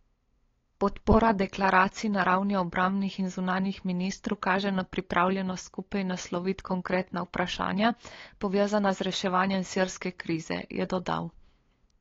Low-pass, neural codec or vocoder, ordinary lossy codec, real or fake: 7.2 kHz; codec, 16 kHz, 8 kbps, FunCodec, trained on LibriTTS, 25 frames a second; AAC, 32 kbps; fake